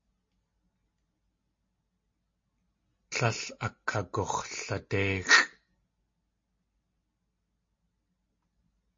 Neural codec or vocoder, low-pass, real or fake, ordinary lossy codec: none; 7.2 kHz; real; MP3, 32 kbps